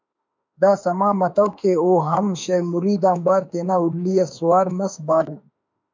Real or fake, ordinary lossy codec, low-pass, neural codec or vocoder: fake; MP3, 64 kbps; 7.2 kHz; autoencoder, 48 kHz, 32 numbers a frame, DAC-VAE, trained on Japanese speech